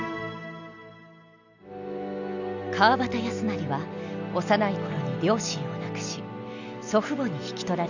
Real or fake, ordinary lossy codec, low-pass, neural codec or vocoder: real; none; 7.2 kHz; none